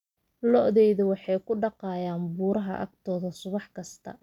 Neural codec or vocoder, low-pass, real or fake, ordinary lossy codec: none; 19.8 kHz; real; none